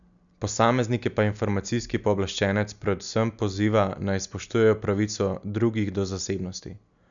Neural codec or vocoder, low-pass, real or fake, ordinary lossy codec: none; 7.2 kHz; real; none